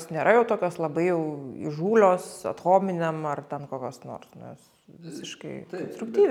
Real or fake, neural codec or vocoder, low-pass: real; none; 19.8 kHz